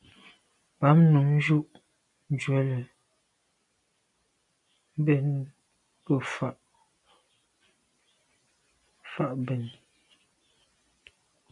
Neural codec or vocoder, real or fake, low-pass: none; real; 10.8 kHz